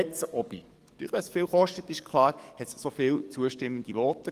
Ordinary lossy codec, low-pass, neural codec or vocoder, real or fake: Opus, 24 kbps; 14.4 kHz; codec, 44.1 kHz, 7.8 kbps, DAC; fake